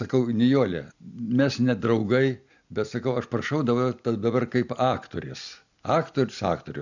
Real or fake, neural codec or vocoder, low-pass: real; none; 7.2 kHz